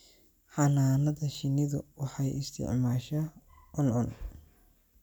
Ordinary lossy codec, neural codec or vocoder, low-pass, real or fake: none; none; none; real